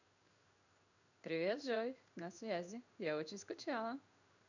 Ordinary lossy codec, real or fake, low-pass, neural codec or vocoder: none; fake; 7.2 kHz; codec, 16 kHz in and 24 kHz out, 1 kbps, XY-Tokenizer